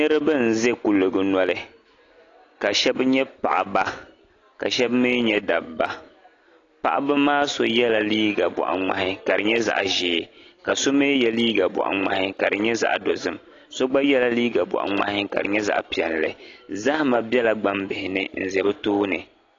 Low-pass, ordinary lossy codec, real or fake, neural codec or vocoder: 7.2 kHz; AAC, 32 kbps; real; none